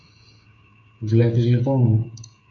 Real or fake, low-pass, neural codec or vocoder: fake; 7.2 kHz; codec, 16 kHz, 8 kbps, FreqCodec, smaller model